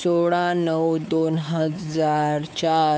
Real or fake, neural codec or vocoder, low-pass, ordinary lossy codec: fake; codec, 16 kHz, 4 kbps, X-Codec, WavLM features, trained on Multilingual LibriSpeech; none; none